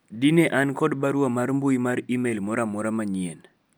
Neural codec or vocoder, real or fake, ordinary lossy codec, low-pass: none; real; none; none